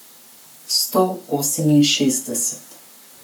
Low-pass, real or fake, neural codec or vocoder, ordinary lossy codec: none; fake; codec, 44.1 kHz, 7.8 kbps, Pupu-Codec; none